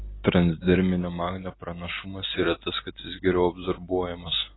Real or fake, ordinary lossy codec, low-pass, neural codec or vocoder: real; AAC, 16 kbps; 7.2 kHz; none